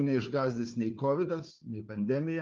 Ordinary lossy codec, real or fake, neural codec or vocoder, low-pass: Opus, 24 kbps; fake; codec, 16 kHz, 4 kbps, FunCodec, trained on Chinese and English, 50 frames a second; 7.2 kHz